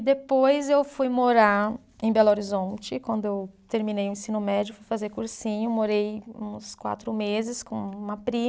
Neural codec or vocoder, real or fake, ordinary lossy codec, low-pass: none; real; none; none